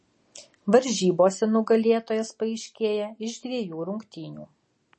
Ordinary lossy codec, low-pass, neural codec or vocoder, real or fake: MP3, 32 kbps; 10.8 kHz; none; real